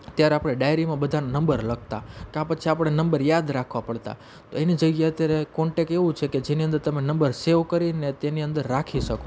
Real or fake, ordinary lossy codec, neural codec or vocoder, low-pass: real; none; none; none